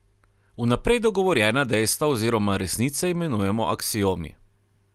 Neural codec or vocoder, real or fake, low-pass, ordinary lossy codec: none; real; 14.4 kHz; Opus, 32 kbps